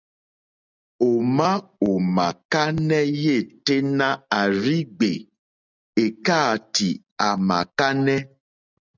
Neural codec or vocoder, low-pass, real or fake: none; 7.2 kHz; real